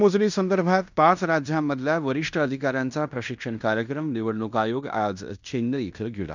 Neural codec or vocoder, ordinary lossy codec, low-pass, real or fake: codec, 16 kHz in and 24 kHz out, 0.9 kbps, LongCat-Audio-Codec, fine tuned four codebook decoder; none; 7.2 kHz; fake